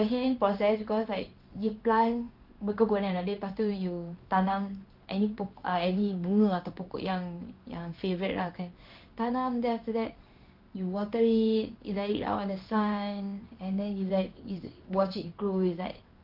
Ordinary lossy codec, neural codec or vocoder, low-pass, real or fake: Opus, 24 kbps; codec, 16 kHz in and 24 kHz out, 1 kbps, XY-Tokenizer; 5.4 kHz; fake